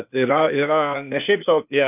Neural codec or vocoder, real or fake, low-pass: codec, 16 kHz, 0.8 kbps, ZipCodec; fake; 3.6 kHz